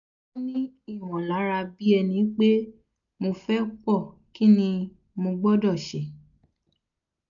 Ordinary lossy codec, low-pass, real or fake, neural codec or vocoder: none; 7.2 kHz; real; none